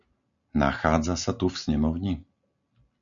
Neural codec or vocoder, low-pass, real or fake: none; 7.2 kHz; real